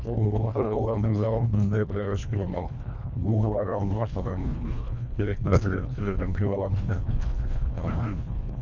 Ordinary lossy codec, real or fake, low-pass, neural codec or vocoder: none; fake; 7.2 kHz; codec, 24 kHz, 1.5 kbps, HILCodec